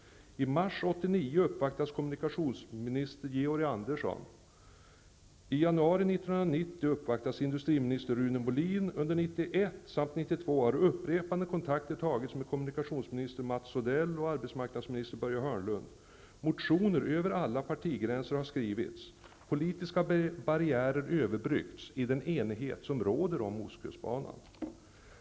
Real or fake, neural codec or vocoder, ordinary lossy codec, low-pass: real; none; none; none